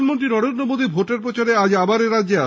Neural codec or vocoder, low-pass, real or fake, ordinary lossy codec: none; none; real; none